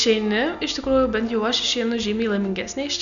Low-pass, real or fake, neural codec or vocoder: 7.2 kHz; real; none